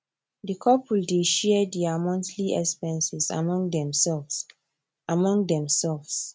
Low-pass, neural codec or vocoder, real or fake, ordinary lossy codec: none; none; real; none